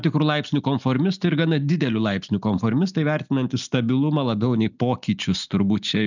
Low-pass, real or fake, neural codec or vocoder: 7.2 kHz; real; none